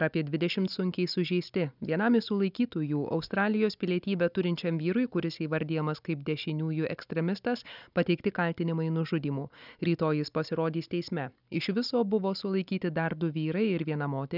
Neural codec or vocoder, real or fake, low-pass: none; real; 5.4 kHz